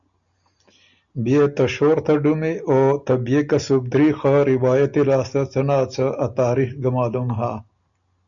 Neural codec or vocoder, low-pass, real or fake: none; 7.2 kHz; real